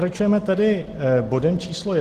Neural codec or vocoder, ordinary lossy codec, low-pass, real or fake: none; Opus, 16 kbps; 14.4 kHz; real